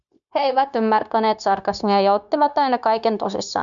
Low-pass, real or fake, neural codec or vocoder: 7.2 kHz; fake; codec, 16 kHz, 0.9 kbps, LongCat-Audio-Codec